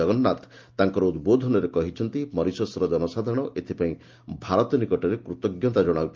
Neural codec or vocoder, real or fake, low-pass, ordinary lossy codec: none; real; 7.2 kHz; Opus, 32 kbps